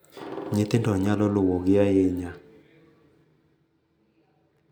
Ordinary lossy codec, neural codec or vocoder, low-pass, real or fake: none; none; none; real